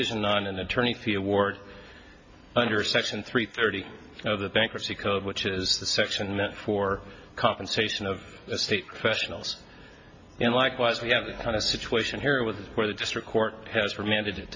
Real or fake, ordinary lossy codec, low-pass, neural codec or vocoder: real; MP3, 32 kbps; 7.2 kHz; none